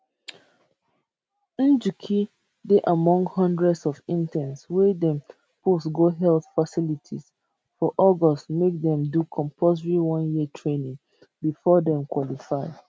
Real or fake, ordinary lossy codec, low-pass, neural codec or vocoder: real; none; none; none